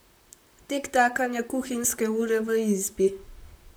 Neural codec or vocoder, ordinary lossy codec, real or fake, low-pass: vocoder, 44.1 kHz, 128 mel bands, Pupu-Vocoder; none; fake; none